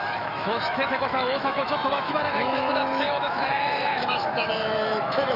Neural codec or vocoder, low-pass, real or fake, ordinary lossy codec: autoencoder, 48 kHz, 128 numbers a frame, DAC-VAE, trained on Japanese speech; 5.4 kHz; fake; none